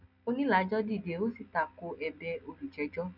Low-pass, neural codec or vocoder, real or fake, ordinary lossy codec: 5.4 kHz; none; real; none